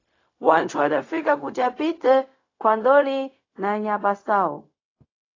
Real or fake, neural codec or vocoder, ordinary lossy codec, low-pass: fake; codec, 16 kHz, 0.4 kbps, LongCat-Audio-Codec; AAC, 32 kbps; 7.2 kHz